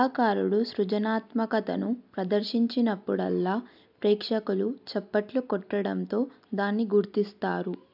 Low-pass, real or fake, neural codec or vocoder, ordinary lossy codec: 5.4 kHz; real; none; AAC, 48 kbps